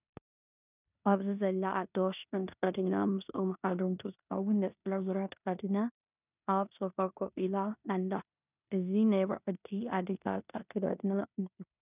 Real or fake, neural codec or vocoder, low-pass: fake; codec, 16 kHz in and 24 kHz out, 0.9 kbps, LongCat-Audio-Codec, four codebook decoder; 3.6 kHz